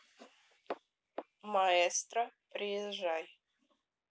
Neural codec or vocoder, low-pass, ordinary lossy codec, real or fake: none; none; none; real